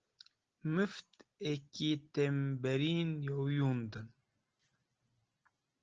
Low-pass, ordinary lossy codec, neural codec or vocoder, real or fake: 7.2 kHz; Opus, 16 kbps; none; real